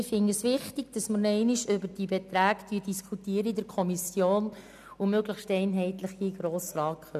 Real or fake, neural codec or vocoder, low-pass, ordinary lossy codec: real; none; 14.4 kHz; none